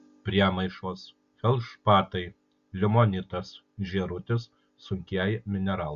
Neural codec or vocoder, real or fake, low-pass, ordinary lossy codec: none; real; 7.2 kHz; Opus, 64 kbps